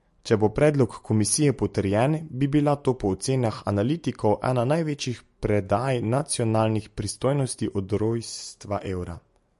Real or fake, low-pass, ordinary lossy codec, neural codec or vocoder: real; 14.4 kHz; MP3, 48 kbps; none